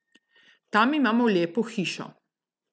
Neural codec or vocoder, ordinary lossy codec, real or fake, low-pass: none; none; real; none